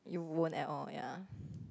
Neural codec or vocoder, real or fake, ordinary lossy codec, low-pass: none; real; none; none